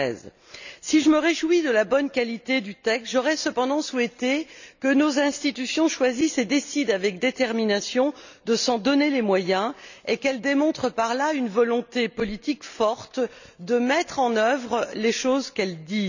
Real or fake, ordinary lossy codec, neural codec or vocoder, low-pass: real; none; none; 7.2 kHz